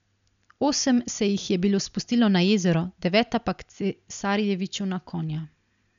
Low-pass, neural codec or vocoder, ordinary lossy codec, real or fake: 7.2 kHz; none; none; real